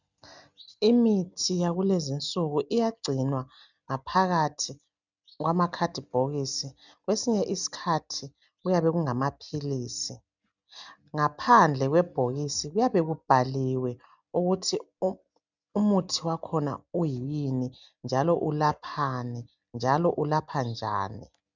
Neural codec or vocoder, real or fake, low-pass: none; real; 7.2 kHz